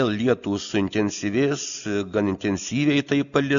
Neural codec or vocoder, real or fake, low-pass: none; real; 7.2 kHz